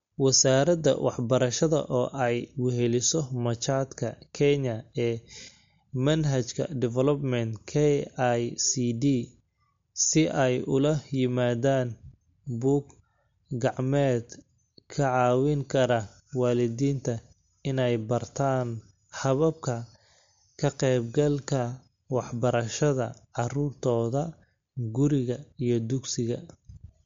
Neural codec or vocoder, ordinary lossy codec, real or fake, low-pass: none; MP3, 48 kbps; real; 7.2 kHz